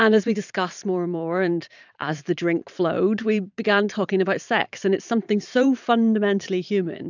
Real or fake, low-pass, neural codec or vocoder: fake; 7.2 kHz; vocoder, 44.1 kHz, 128 mel bands every 512 samples, BigVGAN v2